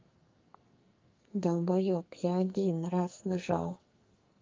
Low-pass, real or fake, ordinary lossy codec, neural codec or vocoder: 7.2 kHz; fake; Opus, 32 kbps; codec, 44.1 kHz, 2.6 kbps, SNAC